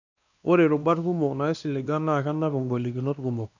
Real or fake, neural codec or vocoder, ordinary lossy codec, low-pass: fake; codec, 16 kHz, 2 kbps, X-Codec, WavLM features, trained on Multilingual LibriSpeech; none; 7.2 kHz